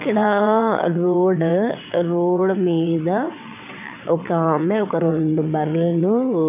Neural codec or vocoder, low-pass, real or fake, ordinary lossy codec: vocoder, 22.05 kHz, 80 mel bands, WaveNeXt; 3.6 kHz; fake; none